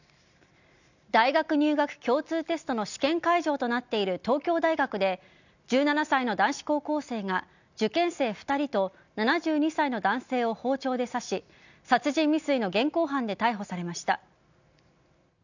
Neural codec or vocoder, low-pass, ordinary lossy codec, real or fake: none; 7.2 kHz; none; real